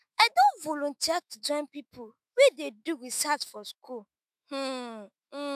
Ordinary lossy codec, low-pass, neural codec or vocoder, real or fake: none; 14.4 kHz; autoencoder, 48 kHz, 128 numbers a frame, DAC-VAE, trained on Japanese speech; fake